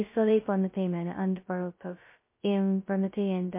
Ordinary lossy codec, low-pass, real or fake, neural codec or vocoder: MP3, 24 kbps; 3.6 kHz; fake; codec, 16 kHz, 0.2 kbps, FocalCodec